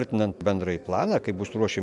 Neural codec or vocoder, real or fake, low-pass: none; real; 10.8 kHz